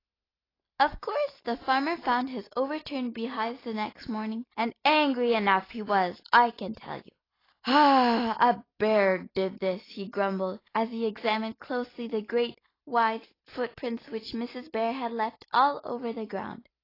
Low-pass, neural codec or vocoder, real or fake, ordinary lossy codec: 5.4 kHz; none; real; AAC, 24 kbps